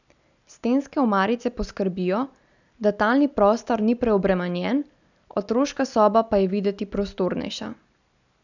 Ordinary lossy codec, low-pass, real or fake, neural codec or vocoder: none; 7.2 kHz; real; none